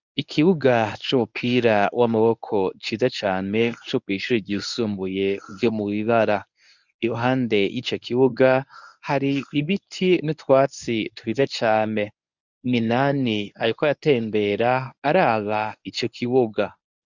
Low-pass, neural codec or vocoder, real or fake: 7.2 kHz; codec, 24 kHz, 0.9 kbps, WavTokenizer, medium speech release version 1; fake